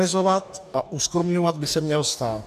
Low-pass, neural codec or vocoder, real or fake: 14.4 kHz; codec, 44.1 kHz, 2.6 kbps, DAC; fake